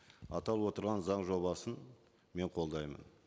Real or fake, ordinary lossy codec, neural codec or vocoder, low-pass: real; none; none; none